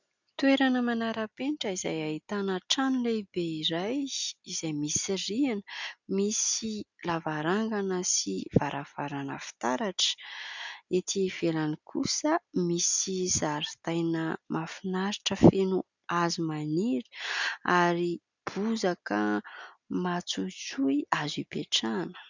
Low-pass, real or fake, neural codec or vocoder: 7.2 kHz; real; none